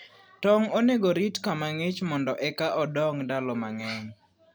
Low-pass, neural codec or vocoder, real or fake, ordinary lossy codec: none; none; real; none